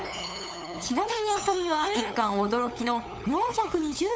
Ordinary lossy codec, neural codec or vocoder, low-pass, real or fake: none; codec, 16 kHz, 8 kbps, FunCodec, trained on LibriTTS, 25 frames a second; none; fake